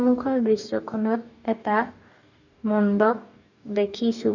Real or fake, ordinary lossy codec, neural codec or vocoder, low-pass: fake; none; codec, 44.1 kHz, 2.6 kbps, DAC; 7.2 kHz